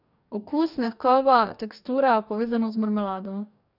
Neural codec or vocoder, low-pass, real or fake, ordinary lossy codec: codec, 44.1 kHz, 2.6 kbps, DAC; 5.4 kHz; fake; none